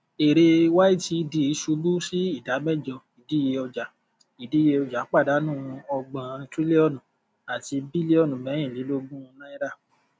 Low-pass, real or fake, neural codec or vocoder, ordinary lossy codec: none; real; none; none